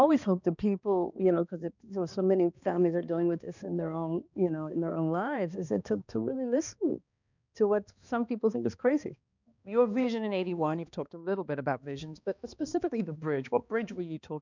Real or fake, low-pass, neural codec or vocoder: fake; 7.2 kHz; codec, 16 kHz, 2 kbps, X-Codec, HuBERT features, trained on balanced general audio